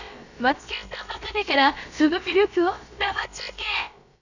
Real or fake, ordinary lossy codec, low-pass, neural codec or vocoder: fake; none; 7.2 kHz; codec, 16 kHz, about 1 kbps, DyCAST, with the encoder's durations